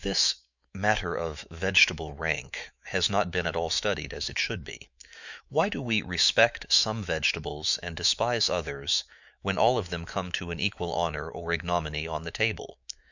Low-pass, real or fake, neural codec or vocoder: 7.2 kHz; fake; autoencoder, 48 kHz, 128 numbers a frame, DAC-VAE, trained on Japanese speech